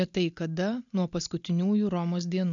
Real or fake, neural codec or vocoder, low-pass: real; none; 7.2 kHz